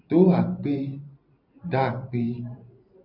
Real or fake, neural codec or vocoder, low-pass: fake; vocoder, 44.1 kHz, 128 mel bands every 512 samples, BigVGAN v2; 5.4 kHz